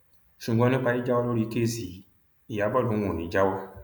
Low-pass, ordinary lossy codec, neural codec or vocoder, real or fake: 19.8 kHz; none; none; real